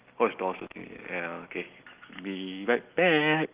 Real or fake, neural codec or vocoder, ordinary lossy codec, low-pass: real; none; Opus, 24 kbps; 3.6 kHz